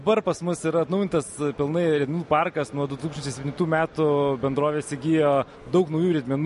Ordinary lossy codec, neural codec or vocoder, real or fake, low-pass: MP3, 48 kbps; none; real; 14.4 kHz